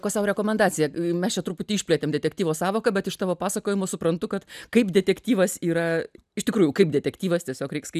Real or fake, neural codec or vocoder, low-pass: real; none; 14.4 kHz